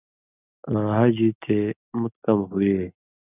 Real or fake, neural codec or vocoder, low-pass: real; none; 3.6 kHz